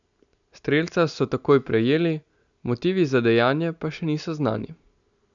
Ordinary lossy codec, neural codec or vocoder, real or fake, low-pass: none; none; real; 7.2 kHz